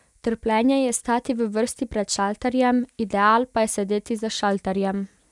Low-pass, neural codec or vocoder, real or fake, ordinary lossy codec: 10.8 kHz; none; real; none